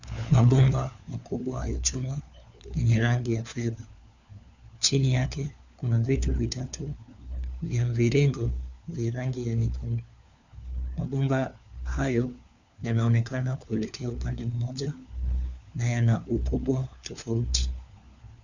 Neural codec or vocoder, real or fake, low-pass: codec, 16 kHz, 4 kbps, FunCodec, trained on LibriTTS, 50 frames a second; fake; 7.2 kHz